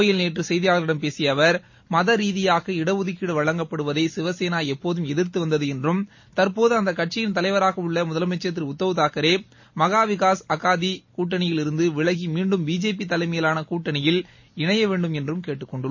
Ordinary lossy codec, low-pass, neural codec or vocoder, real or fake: MP3, 32 kbps; 7.2 kHz; none; real